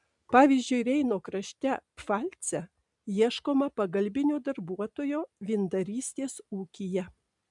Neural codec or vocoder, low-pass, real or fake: none; 10.8 kHz; real